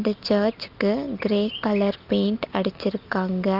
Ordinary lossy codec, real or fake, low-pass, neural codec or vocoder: Opus, 32 kbps; real; 5.4 kHz; none